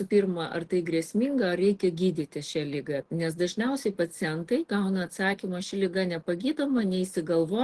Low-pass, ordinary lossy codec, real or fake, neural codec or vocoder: 10.8 kHz; Opus, 16 kbps; real; none